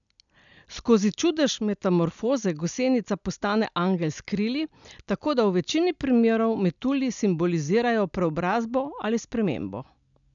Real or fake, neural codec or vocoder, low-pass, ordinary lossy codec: real; none; 7.2 kHz; none